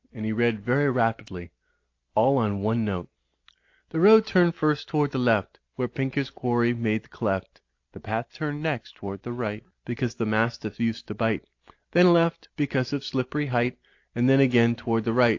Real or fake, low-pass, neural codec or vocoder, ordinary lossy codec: real; 7.2 kHz; none; AAC, 48 kbps